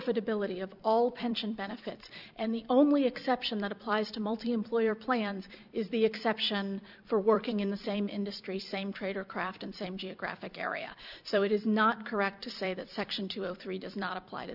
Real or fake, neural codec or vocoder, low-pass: fake; vocoder, 44.1 kHz, 128 mel bands every 256 samples, BigVGAN v2; 5.4 kHz